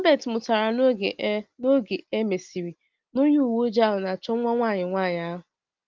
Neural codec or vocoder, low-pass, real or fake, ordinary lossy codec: none; 7.2 kHz; real; Opus, 24 kbps